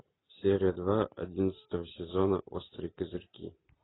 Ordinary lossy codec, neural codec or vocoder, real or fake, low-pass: AAC, 16 kbps; none; real; 7.2 kHz